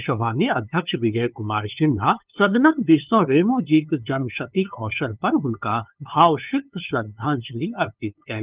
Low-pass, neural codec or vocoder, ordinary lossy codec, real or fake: 3.6 kHz; codec, 16 kHz, 2 kbps, FunCodec, trained on LibriTTS, 25 frames a second; Opus, 24 kbps; fake